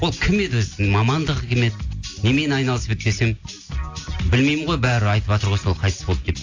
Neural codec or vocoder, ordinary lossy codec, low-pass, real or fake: none; AAC, 48 kbps; 7.2 kHz; real